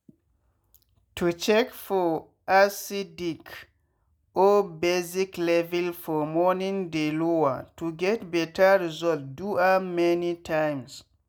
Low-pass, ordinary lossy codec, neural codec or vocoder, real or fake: none; none; none; real